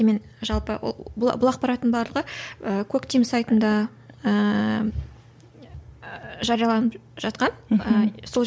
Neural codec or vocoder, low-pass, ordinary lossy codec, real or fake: none; none; none; real